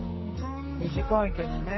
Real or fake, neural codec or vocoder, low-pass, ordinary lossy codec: fake; codec, 44.1 kHz, 2.6 kbps, SNAC; 7.2 kHz; MP3, 24 kbps